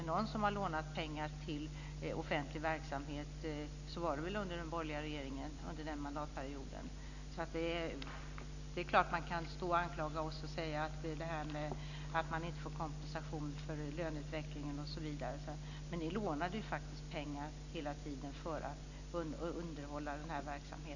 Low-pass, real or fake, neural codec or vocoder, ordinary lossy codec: 7.2 kHz; real; none; none